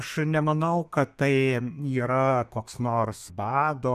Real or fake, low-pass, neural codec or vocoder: fake; 14.4 kHz; codec, 32 kHz, 1.9 kbps, SNAC